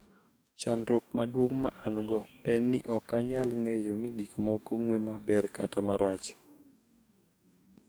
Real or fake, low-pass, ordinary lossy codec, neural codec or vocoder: fake; none; none; codec, 44.1 kHz, 2.6 kbps, DAC